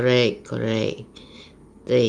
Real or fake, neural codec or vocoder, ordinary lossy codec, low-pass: real; none; Opus, 32 kbps; 9.9 kHz